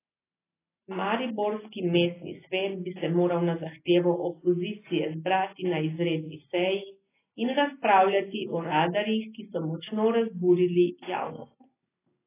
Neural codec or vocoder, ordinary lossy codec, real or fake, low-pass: none; AAC, 16 kbps; real; 3.6 kHz